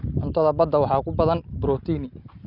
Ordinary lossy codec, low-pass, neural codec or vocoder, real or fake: none; 5.4 kHz; none; real